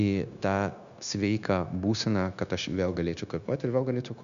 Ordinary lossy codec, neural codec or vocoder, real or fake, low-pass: Opus, 64 kbps; codec, 16 kHz, 0.9 kbps, LongCat-Audio-Codec; fake; 7.2 kHz